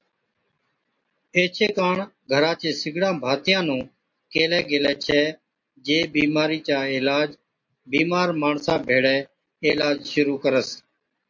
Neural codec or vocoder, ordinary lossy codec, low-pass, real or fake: none; MP3, 48 kbps; 7.2 kHz; real